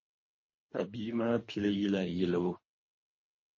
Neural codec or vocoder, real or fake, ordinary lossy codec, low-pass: codec, 24 kHz, 3 kbps, HILCodec; fake; MP3, 32 kbps; 7.2 kHz